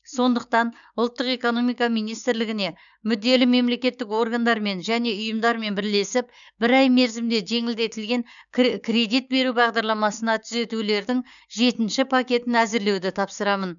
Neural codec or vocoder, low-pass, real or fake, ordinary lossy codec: codec, 16 kHz, 6 kbps, DAC; 7.2 kHz; fake; none